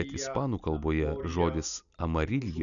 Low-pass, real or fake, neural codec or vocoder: 7.2 kHz; real; none